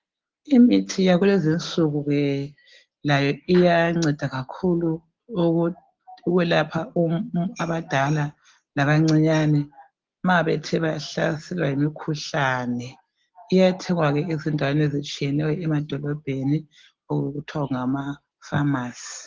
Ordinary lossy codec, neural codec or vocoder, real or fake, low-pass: Opus, 16 kbps; none; real; 7.2 kHz